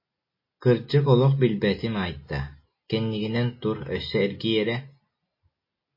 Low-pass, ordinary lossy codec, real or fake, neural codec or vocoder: 5.4 kHz; MP3, 24 kbps; real; none